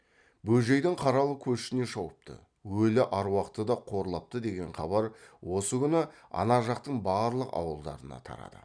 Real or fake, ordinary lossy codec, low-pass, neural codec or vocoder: real; none; 9.9 kHz; none